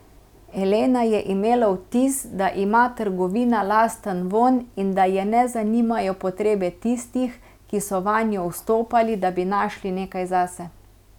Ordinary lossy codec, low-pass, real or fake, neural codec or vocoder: none; 19.8 kHz; real; none